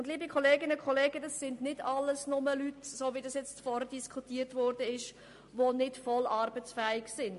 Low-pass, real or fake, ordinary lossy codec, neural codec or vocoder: 14.4 kHz; real; MP3, 48 kbps; none